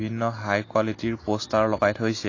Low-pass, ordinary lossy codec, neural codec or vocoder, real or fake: 7.2 kHz; AAC, 32 kbps; none; real